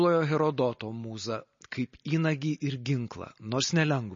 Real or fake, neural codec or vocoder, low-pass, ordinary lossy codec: real; none; 7.2 kHz; MP3, 32 kbps